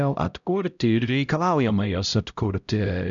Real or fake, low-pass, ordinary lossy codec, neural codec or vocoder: fake; 7.2 kHz; MP3, 96 kbps; codec, 16 kHz, 0.5 kbps, X-Codec, HuBERT features, trained on LibriSpeech